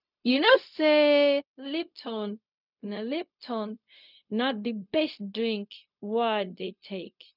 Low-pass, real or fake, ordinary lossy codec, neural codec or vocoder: 5.4 kHz; fake; none; codec, 16 kHz, 0.4 kbps, LongCat-Audio-Codec